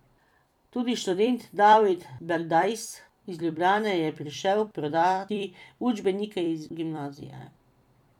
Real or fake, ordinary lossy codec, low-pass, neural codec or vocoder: fake; none; 19.8 kHz; vocoder, 44.1 kHz, 128 mel bands every 256 samples, BigVGAN v2